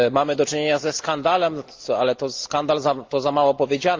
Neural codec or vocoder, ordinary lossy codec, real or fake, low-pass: none; Opus, 32 kbps; real; 7.2 kHz